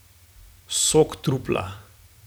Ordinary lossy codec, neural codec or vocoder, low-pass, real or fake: none; vocoder, 44.1 kHz, 128 mel bands every 256 samples, BigVGAN v2; none; fake